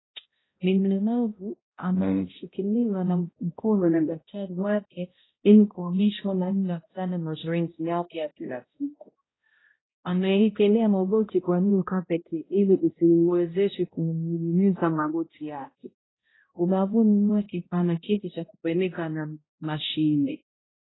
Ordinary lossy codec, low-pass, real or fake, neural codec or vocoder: AAC, 16 kbps; 7.2 kHz; fake; codec, 16 kHz, 0.5 kbps, X-Codec, HuBERT features, trained on balanced general audio